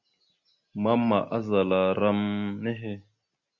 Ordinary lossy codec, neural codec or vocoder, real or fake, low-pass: Opus, 64 kbps; none; real; 7.2 kHz